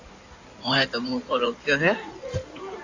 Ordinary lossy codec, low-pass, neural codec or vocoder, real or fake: AAC, 48 kbps; 7.2 kHz; codec, 16 kHz in and 24 kHz out, 2.2 kbps, FireRedTTS-2 codec; fake